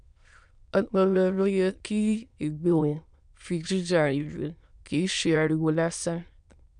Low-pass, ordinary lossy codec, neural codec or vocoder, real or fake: 9.9 kHz; MP3, 96 kbps; autoencoder, 22.05 kHz, a latent of 192 numbers a frame, VITS, trained on many speakers; fake